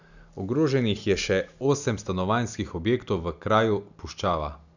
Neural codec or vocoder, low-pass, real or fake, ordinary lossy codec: none; 7.2 kHz; real; none